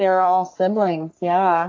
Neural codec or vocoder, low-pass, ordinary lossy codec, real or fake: codec, 44.1 kHz, 3.4 kbps, Pupu-Codec; 7.2 kHz; AAC, 48 kbps; fake